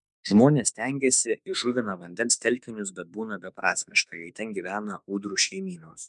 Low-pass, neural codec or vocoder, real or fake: 10.8 kHz; autoencoder, 48 kHz, 32 numbers a frame, DAC-VAE, trained on Japanese speech; fake